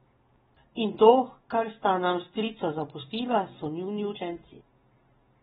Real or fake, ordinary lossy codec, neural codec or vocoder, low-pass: real; AAC, 16 kbps; none; 19.8 kHz